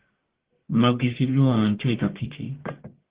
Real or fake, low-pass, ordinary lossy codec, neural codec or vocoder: fake; 3.6 kHz; Opus, 16 kbps; codec, 44.1 kHz, 1.7 kbps, Pupu-Codec